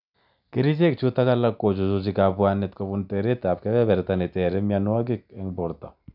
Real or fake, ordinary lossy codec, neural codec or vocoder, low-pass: real; none; none; 5.4 kHz